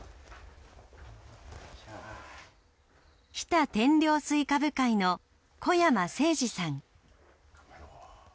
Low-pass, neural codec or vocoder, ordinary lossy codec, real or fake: none; none; none; real